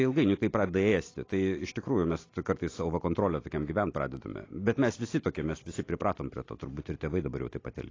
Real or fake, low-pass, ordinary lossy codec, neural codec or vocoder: real; 7.2 kHz; AAC, 32 kbps; none